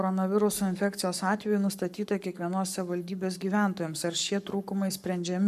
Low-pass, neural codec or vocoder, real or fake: 14.4 kHz; codec, 44.1 kHz, 7.8 kbps, Pupu-Codec; fake